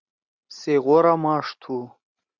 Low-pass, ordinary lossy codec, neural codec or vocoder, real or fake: 7.2 kHz; Opus, 64 kbps; none; real